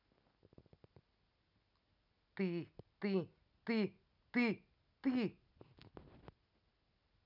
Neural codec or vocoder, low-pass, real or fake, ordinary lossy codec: none; 5.4 kHz; real; none